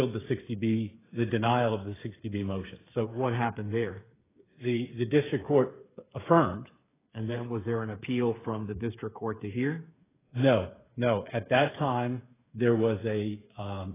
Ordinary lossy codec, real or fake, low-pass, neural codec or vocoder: AAC, 16 kbps; fake; 3.6 kHz; codec, 16 kHz, 8 kbps, FreqCodec, smaller model